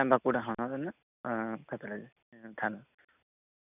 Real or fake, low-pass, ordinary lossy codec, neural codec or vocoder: real; 3.6 kHz; none; none